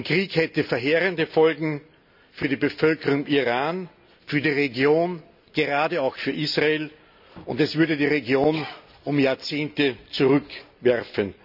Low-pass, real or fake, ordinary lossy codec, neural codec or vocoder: 5.4 kHz; real; none; none